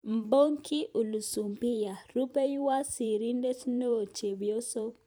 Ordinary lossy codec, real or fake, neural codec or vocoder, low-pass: none; fake; vocoder, 44.1 kHz, 128 mel bands every 512 samples, BigVGAN v2; none